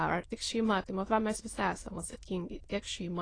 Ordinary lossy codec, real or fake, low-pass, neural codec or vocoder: AAC, 32 kbps; fake; 9.9 kHz; autoencoder, 22.05 kHz, a latent of 192 numbers a frame, VITS, trained on many speakers